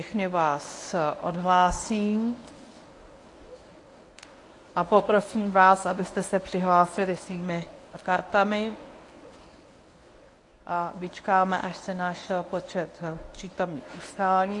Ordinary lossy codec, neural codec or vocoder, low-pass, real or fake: AAC, 48 kbps; codec, 24 kHz, 0.9 kbps, WavTokenizer, medium speech release version 1; 10.8 kHz; fake